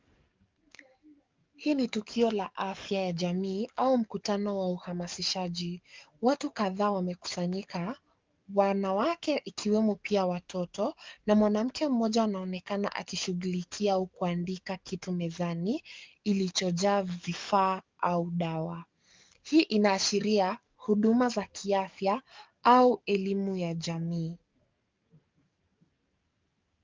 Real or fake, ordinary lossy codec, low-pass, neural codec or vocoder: fake; Opus, 24 kbps; 7.2 kHz; codec, 44.1 kHz, 7.8 kbps, DAC